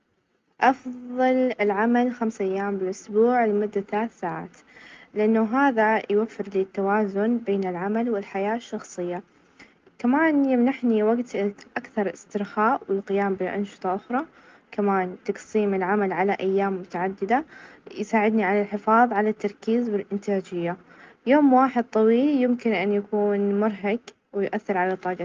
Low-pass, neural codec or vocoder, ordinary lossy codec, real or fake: 7.2 kHz; none; Opus, 32 kbps; real